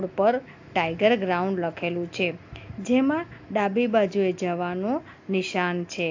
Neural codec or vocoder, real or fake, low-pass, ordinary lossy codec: none; real; 7.2 kHz; AAC, 48 kbps